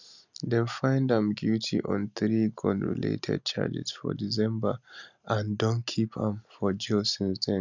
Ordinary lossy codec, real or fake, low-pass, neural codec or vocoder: none; real; 7.2 kHz; none